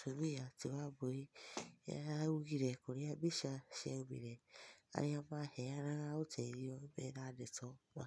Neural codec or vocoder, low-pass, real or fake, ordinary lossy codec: none; none; real; none